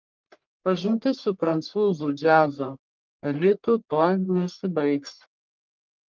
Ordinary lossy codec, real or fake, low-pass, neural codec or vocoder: Opus, 32 kbps; fake; 7.2 kHz; codec, 44.1 kHz, 1.7 kbps, Pupu-Codec